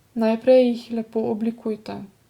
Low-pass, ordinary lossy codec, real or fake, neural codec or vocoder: 19.8 kHz; Opus, 64 kbps; real; none